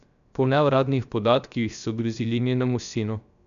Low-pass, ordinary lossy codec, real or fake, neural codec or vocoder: 7.2 kHz; none; fake; codec, 16 kHz, 0.3 kbps, FocalCodec